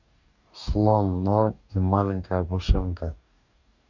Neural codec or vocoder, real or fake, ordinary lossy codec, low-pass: codec, 44.1 kHz, 2.6 kbps, DAC; fake; none; 7.2 kHz